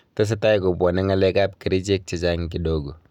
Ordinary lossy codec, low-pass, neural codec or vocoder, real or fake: none; 19.8 kHz; none; real